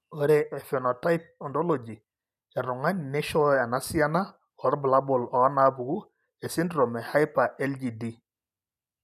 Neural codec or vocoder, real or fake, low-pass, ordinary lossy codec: none; real; 14.4 kHz; none